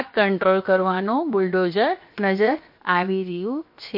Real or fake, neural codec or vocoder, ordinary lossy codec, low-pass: fake; codec, 16 kHz, 0.7 kbps, FocalCodec; MP3, 32 kbps; 5.4 kHz